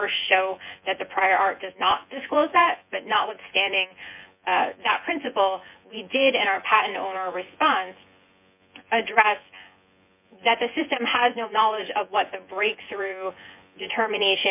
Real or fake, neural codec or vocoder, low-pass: fake; vocoder, 24 kHz, 100 mel bands, Vocos; 3.6 kHz